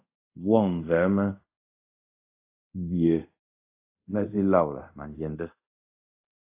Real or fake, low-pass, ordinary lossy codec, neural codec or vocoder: fake; 3.6 kHz; AAC, 24 kbps; codec, 24 kHz, 0.5 kbps, DualCodec